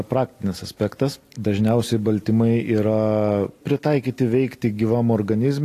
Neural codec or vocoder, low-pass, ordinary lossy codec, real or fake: none; 14.4 kHz; AAC, 48 kbps; real